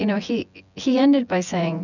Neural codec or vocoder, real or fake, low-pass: vocoder, 24 kHz, 100 mel bands, Vocos; fake; 7.2 kHz